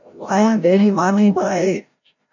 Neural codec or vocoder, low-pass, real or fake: codec, 16 kHz, 0.5 kbps, FreqCodec, larger model; 7.2 kHz; fake